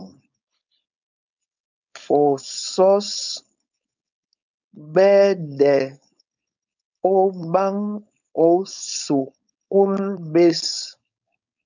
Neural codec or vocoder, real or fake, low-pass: codec, 16 kHz, 4.8 kbps, FACodec; fake; 7.2 kHz